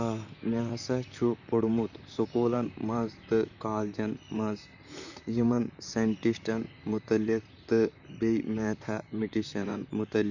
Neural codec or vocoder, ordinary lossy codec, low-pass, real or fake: vocoder, 44.1 kHz, 128 mel bands, Pupu-Vocoder; none; 7.2 kHz; fake